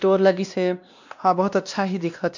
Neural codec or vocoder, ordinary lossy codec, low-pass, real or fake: codec, 16 kHz, 1 kbps, X-Codec, WavLM features, trained on Multilingual LibriSpeech; none; 7.2 kHz; fake